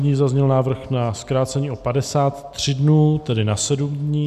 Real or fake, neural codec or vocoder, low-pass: real; none; 14.4 kHz